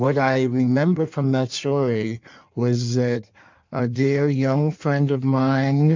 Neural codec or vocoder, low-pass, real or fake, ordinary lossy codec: codec, 16 kHz in and 24 kHz out, 1.1 kbps, FireRedTTS-2 codec; 7.2 kHz; fake; MP3, 64 kbps